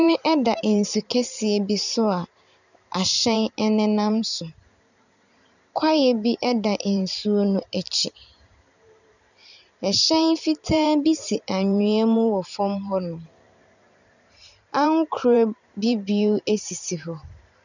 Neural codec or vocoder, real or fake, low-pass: vocoder, 44.1 kHz, 128 mel bands every 512 samples, BigVGAN v2; fake; 7.2 kHz